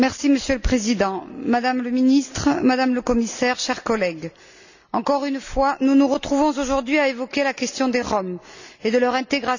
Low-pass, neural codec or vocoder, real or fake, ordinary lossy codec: 7.2 kHz; none; real; none